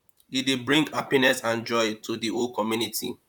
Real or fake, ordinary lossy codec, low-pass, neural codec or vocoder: fake; none; 19.8 kHz; vocoder, 44.1 kHz, 128 mel bands, Pupu-Vocoder